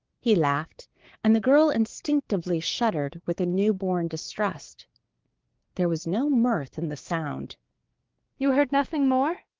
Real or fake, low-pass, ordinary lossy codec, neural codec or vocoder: fake; 7.2 kHz; Opus, 16 kbps; codec, 16 kHz, 6 kbps, DAC